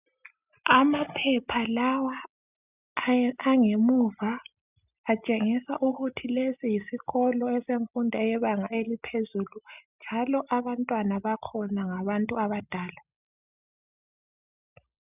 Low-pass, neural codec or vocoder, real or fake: 3.6 kHz; none; real